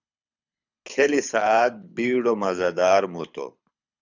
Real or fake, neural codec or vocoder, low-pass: fake; codec, 24 kHz, 6 kbps, HILCodec; 7.2 kHz